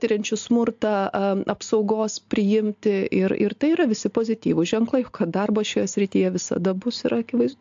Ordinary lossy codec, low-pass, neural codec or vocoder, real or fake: AAC, 64 kbps; 7.2 kHz; none; real